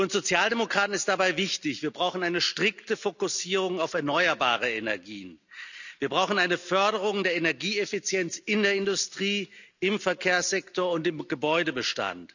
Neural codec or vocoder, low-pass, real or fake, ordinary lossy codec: none; 7.2 kHz; real; none